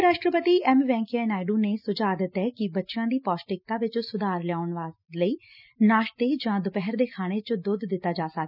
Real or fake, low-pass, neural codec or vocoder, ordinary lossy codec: real; 5.4 kHz; none; none